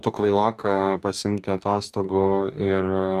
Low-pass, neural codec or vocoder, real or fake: 14.4 kHz; codec, 44.1 kHz, 2.6 kbps, DAC; fake